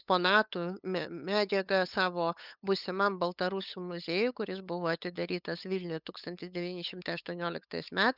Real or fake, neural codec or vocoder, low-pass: fake; codec, 16 kHz, 8 kbps, FunCodec, trained on LibriTTS, 25 frames a second; 5.4 kHz